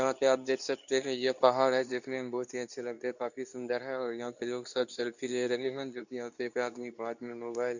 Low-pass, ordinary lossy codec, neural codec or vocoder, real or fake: 7.2 kHz; none; codec, 24 kHz, 0.9 kbps, WavTokenizer, medium speech release version 2; fake